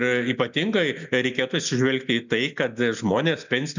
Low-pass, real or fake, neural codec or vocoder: 7.2 kHz; real; none